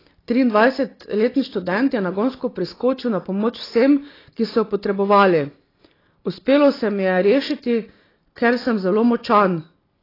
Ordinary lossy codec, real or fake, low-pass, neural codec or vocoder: AAC, 24 kbps; real; 5.4 kHz; none